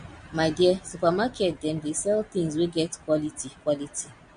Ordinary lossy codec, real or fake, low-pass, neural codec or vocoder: MP3, 48 kbps; real; 9.9 kHz; none